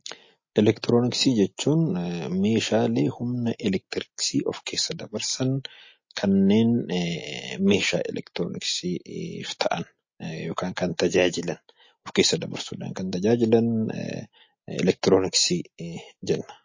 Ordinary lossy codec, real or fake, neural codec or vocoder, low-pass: MP3, 32 kbps; real; none; 7.2 kHz